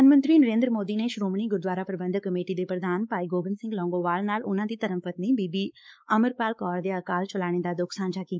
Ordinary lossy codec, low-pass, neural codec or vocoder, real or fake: none; none; codec, 16 kHz, 4 kbps, X-Codec, WavLM features, trained on Multilingual LibriSpeech; fake